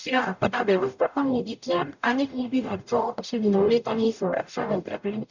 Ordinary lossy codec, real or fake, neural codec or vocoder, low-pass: none; fake; codec, 44.1 kHz, 0.9 kbps, DAC; 7.2 kHz